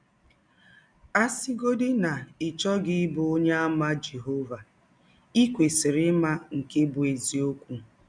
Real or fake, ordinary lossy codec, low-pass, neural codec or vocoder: real; none; 9.9 kHz; none